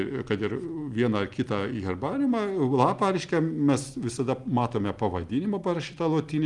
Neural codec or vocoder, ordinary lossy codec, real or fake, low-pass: none; Opus, 32 kbps; real; 10.8 kHz